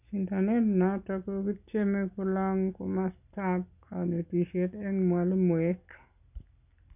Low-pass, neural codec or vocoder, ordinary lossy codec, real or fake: 3.6 kHz; none; none; real